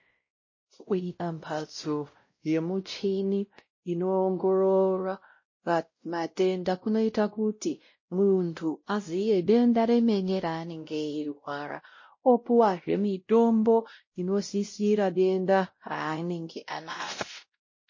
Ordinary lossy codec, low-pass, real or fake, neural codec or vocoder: MP3, 32 kbps; 7.2 kHz; fake; codec, 16 kHz, 0.5 kbps, X-Codec, WavLM features, trained on Multilingual LibriSpeech